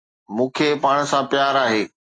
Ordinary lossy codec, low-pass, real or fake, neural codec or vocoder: AAC, 32 kbps; 9.9 kHz; real; none